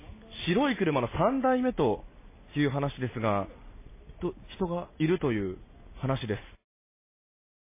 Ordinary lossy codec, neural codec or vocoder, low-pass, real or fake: MP3, 16 kbps; none; 3.6 kHz; real